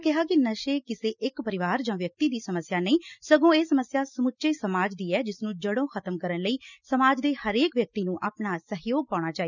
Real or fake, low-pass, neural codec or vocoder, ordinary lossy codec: real; 7.2 kHz; none; none